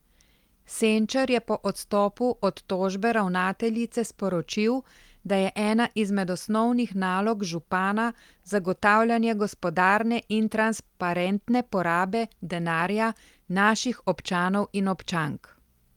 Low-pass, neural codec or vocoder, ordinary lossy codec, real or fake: 19.8 kHz; none; Opus, 32 kbps; real